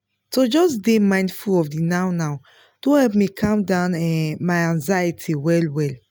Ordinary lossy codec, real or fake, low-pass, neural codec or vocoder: none; real; none; none